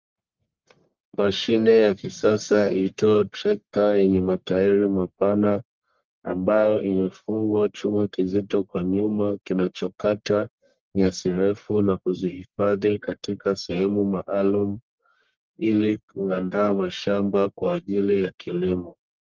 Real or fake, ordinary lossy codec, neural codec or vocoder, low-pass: fake; Opus, 32 kbps; codec, 44.1 kHz, 1.7 kbps, Pupu-Codec; 7.2 kHz